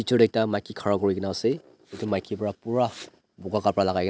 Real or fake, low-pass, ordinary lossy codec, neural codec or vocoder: real; none; none; none